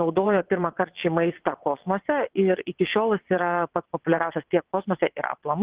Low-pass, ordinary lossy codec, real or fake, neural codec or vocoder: 3.6 kHz; Opus, 16 kbps; fake; vocoder, 22.05 kHz, 80 mel bands, WaveNeXt